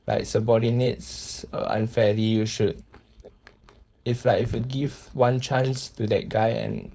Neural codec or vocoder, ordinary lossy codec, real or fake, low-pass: codec, 16 kHz, 4.8 kbps, FACodec; none; fake; none